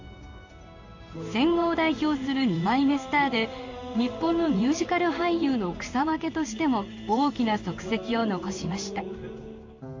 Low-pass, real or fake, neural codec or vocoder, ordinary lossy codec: 7.2 kHz; fake; codec, 16 kHz in and 24 kHz out, 1 kbps, XY-Tokenizer; AAC, 48 kbps